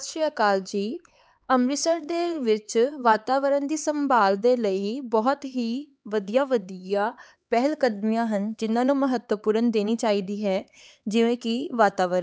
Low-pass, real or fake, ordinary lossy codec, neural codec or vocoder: none; fake; none; codec, 16 kHz, 4 kbps, X-Codec, HuBERT features, trained on LibriSpeech